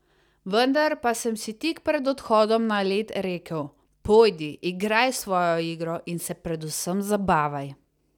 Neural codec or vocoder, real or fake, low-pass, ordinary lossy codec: none; real; 19.8 kHz; none